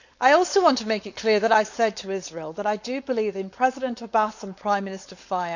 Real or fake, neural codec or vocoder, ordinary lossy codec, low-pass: fake; codec, 16 kHz, 4.8 kbps, FACodec; none; 7.2 kHz